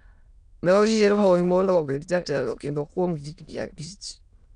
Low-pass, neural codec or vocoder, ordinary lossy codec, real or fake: 9.9 kHz; autoencoder, 22.05 kHz, a latent of 192 numbers a frame, VITS, trained on many speakers; none; fake